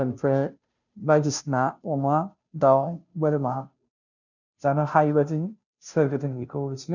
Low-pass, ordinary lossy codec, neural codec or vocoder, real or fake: 7.2 kHz; none; codec, 16 kHz, 0.5 kbps, FunCodec, trained on Chinese and English, 25 frames a second; fake